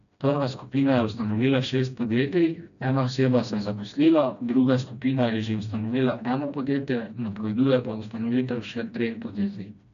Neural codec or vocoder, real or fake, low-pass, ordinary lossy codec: codec, 16 kHz, 1 kbps, FreqCodec, smaller model; fake; 7.2 kHz; none